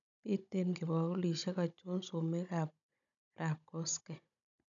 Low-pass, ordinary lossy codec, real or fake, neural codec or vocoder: 7.2 kHz; none; fake; codec, 16 kHz, 16 kbps, FunCodec, trained on Chinese and English, 50 frames a second